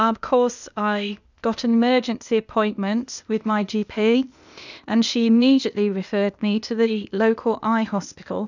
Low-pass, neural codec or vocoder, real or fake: 7.2 kHz; codec, 16 kHz, 0.8 kbps, ZipCodec; fake